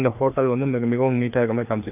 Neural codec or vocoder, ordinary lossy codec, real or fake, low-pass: codec, 16 kHz, 2 kbps, FreqCodec, larger model; none; fake; 3.6 kHz